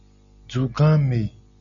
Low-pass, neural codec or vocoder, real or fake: 7.2 kHz; none; real